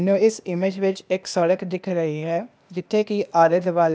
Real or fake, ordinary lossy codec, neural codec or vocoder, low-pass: fake; none; codec, 16 kHz, 0.8 kbps, ZipCodec; none